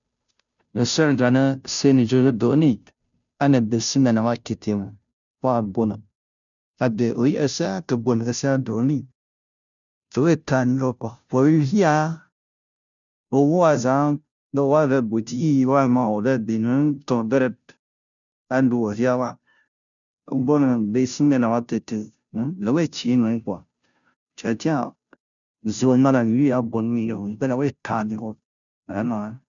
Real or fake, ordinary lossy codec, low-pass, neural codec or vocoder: fake; none; 7.2 kHz; codec, 16 kHz, 0.5 kbps, FunCodec, trained on Chinese and English, 25 frames a second